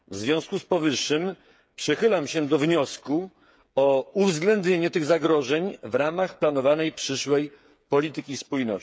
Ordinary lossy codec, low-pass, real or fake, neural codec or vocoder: none; none; fake; codec, 16 kHz, 8 kbps, FreqCodec, smaller model